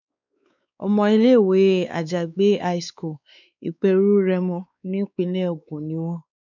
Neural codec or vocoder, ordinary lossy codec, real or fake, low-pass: codec, 16 kHz, 4 kbps, X-Codec, WavLM features, trained on Multilingual LibriSpeech; none; fake; 7.2 kHz